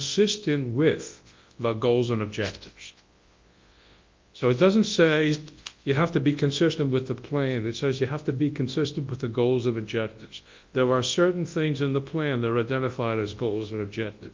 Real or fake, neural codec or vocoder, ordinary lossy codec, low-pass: fake; codec, 24 kHz, 0.9 kbps, WavTokenizer, large speech release; Opus, 32 kbps; 7.2 kHz